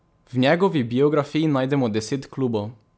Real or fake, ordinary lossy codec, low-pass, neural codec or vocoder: real; none; none; none